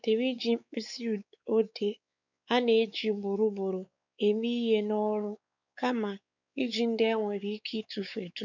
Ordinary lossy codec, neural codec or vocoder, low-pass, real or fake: none; codec, 16 kHz, 4 kbps, X-Codec, WavLM features, trained on Multilingual LibriSpeech; 7.2 kHz; fake